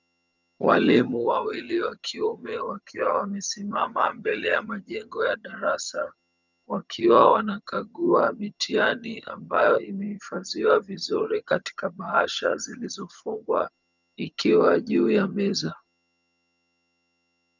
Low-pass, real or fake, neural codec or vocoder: 7.2 kHz; fake; vocoder, 22.05 kHz, 80 mel bands, HiFi-GAN